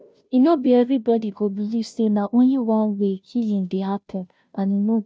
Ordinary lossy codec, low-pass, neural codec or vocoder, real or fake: none; none; codec, 16 kHz, 0.5 kbps, FunCodec, trained on Chinese and English, 25 frames a second; fake